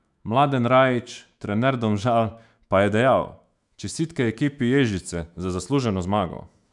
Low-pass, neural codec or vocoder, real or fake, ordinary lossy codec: 10.8 kHz; autoencoder, 48 kHz, 128 numbers a frame, DAC-VAE, trained on Japanese speech; fake; none